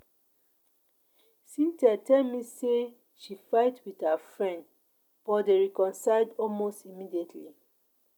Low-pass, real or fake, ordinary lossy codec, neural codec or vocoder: 19.8 kHz; real; none; none